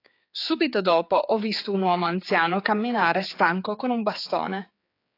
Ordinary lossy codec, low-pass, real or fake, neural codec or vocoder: AAC, 32 kbps; 5.4 kHz; fake; codec, 16 kHz, 4 kbps, X-Codec, HuBERT features, trained on general audio